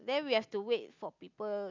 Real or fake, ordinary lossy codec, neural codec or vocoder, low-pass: real; MP3, 64 kbps; none; 7.2 kHz